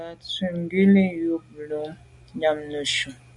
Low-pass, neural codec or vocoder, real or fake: 10.8 kHz; none; real